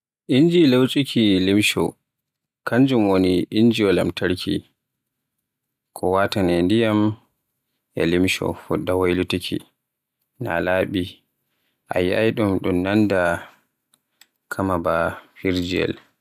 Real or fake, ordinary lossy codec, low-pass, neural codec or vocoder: real; none; 14.4 kHz; none